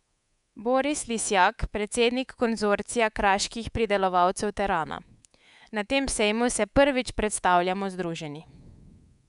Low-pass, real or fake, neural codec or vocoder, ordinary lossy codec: 10.8 kHz; fake; codec, 24 kHz, 3.1 kbps, DualCodec; none